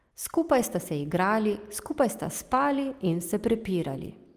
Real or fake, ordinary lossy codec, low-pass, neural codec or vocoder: real; Opus, 24 kbps; 14.4 kHz; none